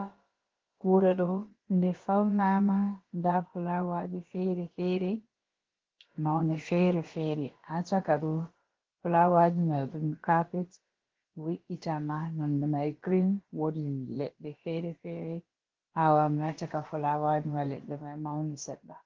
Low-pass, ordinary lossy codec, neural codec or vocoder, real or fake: 7.2 kHz; Opus, 16 kbps; codec, 16 kHz, about 1 kbps, DyCAST, with the encoder's durations; fake